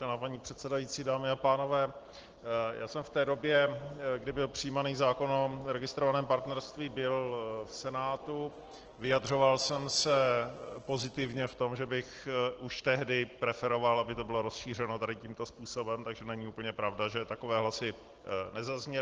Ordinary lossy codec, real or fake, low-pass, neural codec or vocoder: Opus, 16 kbps; real; 7.2 kHz; none